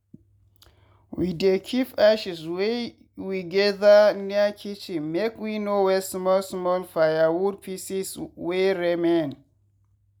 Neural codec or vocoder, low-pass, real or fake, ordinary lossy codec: none; 19.8 kHz; real; none